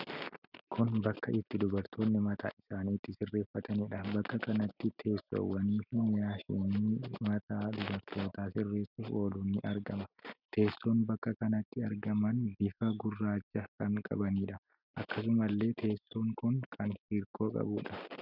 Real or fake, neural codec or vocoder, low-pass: real; none; 5.4 kHz